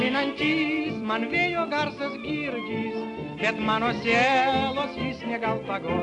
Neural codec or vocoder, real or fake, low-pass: none; real; 10.8 kHz